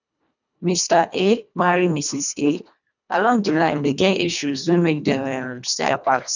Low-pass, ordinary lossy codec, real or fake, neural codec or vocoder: 7.2 kHz; none; fake; codec, 24 kHz, 1.5 kbps, HILCodec